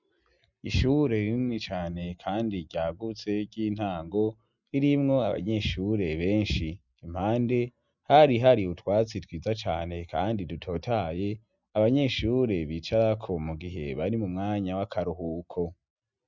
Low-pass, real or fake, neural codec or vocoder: 7.2 kHz; real; none